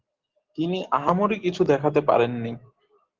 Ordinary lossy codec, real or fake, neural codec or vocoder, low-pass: Opus, 16 kbps; real; none; 7.2 kHz